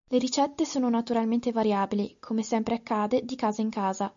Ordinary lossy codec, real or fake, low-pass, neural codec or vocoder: MP3, 64 kbps; real; 7.2 kHz; none